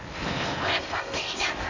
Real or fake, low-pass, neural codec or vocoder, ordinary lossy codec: fake; 7.2 kHz; codec, 16 kHz in and 24 kHz out, 0.8 kbps, FocalCodec, streaming, 65536 codes; none